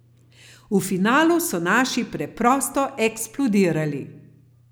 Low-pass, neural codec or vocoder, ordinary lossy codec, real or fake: none; none; none; real